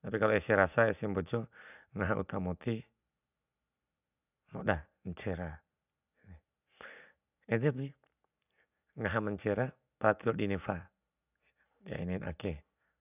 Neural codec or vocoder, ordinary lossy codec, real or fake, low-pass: none; none; real; 3.6 kHz